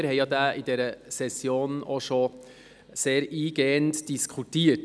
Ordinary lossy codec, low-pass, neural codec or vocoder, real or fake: none; none; none; real